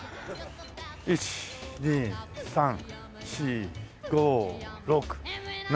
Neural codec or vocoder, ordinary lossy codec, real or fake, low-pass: none; none; real; none